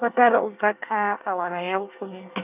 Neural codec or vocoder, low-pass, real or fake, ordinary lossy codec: codec, 24 kHz, 1 kbps, SNAC; 3.6 kHz; fake; none